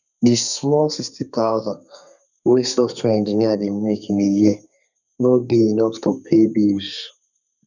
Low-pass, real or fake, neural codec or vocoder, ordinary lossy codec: 7.2 kHz; fake; codec, 32 kHz, 1.9 kbps, SNAC; none